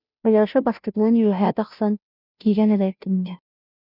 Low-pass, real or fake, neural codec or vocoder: 5.4 kHz; fake; codec, 16 kHz, 0.5 kbps, FunCodec, trained on Chinese and English, 25 frames a second